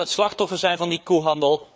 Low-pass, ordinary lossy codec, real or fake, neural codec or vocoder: none; none; fake; codec, 16 kHz, 4 kbps, FreqCodec, larger model